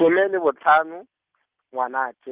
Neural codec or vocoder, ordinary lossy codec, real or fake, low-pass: none; Opus, 24 kbps; real; 3.6 kHz